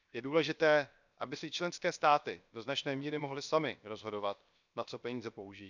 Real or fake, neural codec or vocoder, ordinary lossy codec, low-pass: fake; codec, 16 kHz, 0.7 kbps, FocalCodec; none; 7.2 kHz